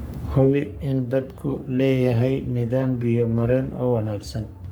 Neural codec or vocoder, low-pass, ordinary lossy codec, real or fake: codec, 44.1 kHz, 3.4 kbps, Pupu-Codec; none; none; fake